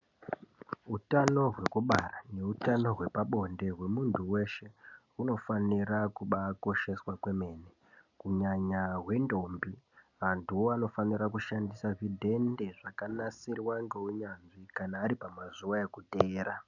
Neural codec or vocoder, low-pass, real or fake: none; 7.2 kHz; real